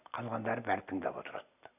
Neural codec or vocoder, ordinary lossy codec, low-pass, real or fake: none; none; 3.6 kHz; real